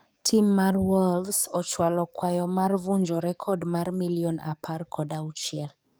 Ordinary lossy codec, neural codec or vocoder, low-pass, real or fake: none; codec, 44.1 kHz, 7.8 kbps, DAC; none; fake